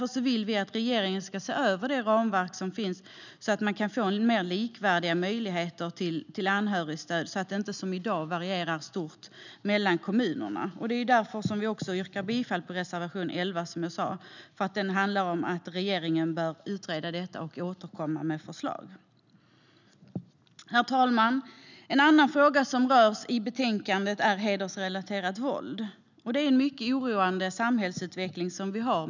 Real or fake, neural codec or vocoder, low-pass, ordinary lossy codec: real; none; 7.2 kHz; none